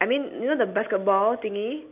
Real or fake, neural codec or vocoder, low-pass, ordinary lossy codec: real; none; 3.6 kHz; AAC, 32 kbps